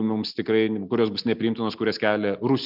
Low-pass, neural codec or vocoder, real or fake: 5.4 kHz; none; real